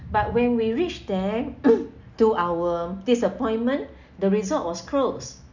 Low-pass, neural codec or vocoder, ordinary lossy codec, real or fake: 7.2 kHz; none; none; real